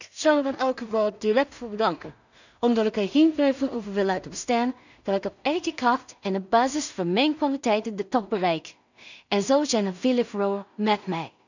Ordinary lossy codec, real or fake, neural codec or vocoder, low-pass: none; fake; codec, 16 kHz in and 24 kHz out, 0.4 kbps, LongCat-Audio-Codec, two codebook decoder; 7.2 kHz